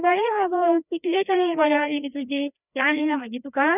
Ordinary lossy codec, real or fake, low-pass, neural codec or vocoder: none; fake; 3.6 kHz; codec, 16 kHz, 1 kbps, FreqCodec, larger model